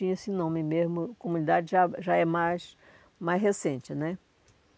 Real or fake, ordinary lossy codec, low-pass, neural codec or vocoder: real; none; none; none